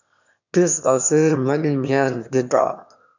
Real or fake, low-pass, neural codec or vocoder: fake; 7.2 kHz; autoencoder, 22.05 kHz, a latent of 192 numbers a frame, VITS, trained on one speaker